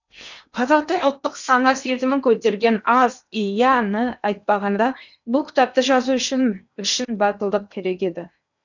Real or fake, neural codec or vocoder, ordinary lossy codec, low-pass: fake; codec, 16 kHz in and 24 kHz out, 0.8 kbps, FocalCodec, streaming, 65536 codes; none; 7.2 kHz